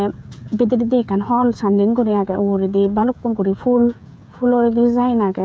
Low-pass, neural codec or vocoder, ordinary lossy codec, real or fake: none; codec, 16 kHz, 16 kbps, FreqCodec, smaller model; none; fake